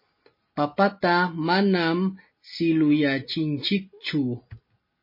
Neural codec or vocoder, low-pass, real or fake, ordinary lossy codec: none; 5.4 kHz; real; MP3, 24 kbps